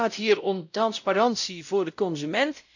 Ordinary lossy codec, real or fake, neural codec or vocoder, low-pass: none; fake; codec, 16 kHz, 0.5 kbps, X-Codec, WavLM features, trained on Multilingual LibriSpeech; 7.2 kHz